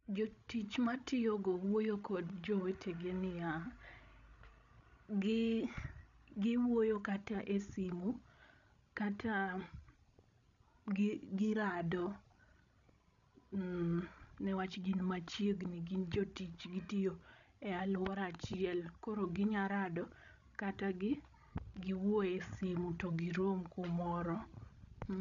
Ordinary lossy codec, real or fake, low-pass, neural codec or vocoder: none; fake; 7.2 kHz; codec, 16 kHz, 16 kbps, FreqCodec, larger model